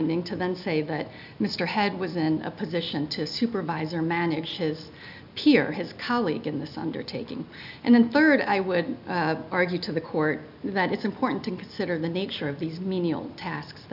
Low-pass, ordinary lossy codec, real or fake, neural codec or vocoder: 5.4 kHz; AAC, 48 kbps; real; none